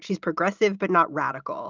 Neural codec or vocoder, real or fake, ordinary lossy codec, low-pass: none; real; Opus, 32 kbps; 7.2 kHz